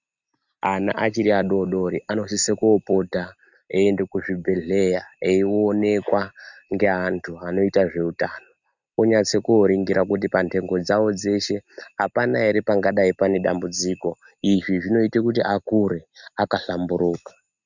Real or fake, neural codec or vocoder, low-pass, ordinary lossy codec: real; none; 7.2 kHz; Opus, 64 kbps